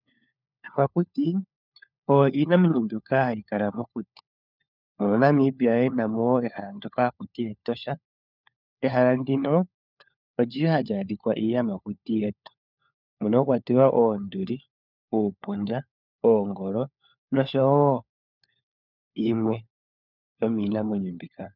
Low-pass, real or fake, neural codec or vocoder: 5.4 kHz; fake; codec, 16 kHz, 4 kbps, FunCodec, trained on LibriTTS, 50 frames a second